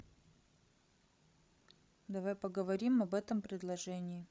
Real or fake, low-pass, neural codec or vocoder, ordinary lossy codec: fake; none; codec, 16 kHz, 16 kbps, FreqCodec, larger model; none